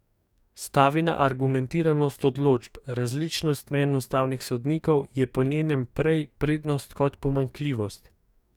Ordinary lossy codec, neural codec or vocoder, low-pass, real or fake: none; codec, 44.1 kHz, 2.6 kbps, DAC; 19.8 kHz; fake